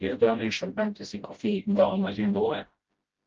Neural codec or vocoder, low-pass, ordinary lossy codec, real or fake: codec, 16 kHz, 0.5 kbps, FreqCodec, smaller model; 7.2 kHz; Opus, 24 kbps; fake